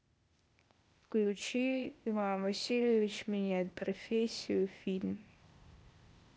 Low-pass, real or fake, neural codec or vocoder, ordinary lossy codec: none; fake; codec, 16 kHz, 0.8 kbps, ZipCodec; none